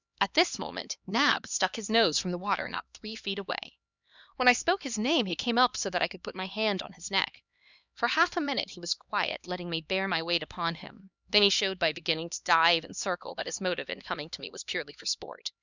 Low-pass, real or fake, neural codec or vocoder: 7.2 kHz; fake; codec, 16 kHz, 2 kbps, X-Codec, HuBERT features, trained on LibriSpeech